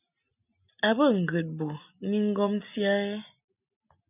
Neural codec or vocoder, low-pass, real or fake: none; 3.6 kHz; real